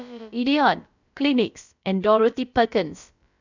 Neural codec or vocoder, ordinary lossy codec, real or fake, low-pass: codec, 16 kHz, about 1 kbps, DyCAST, with the encoder's durations; none; fake; 7.2 kHz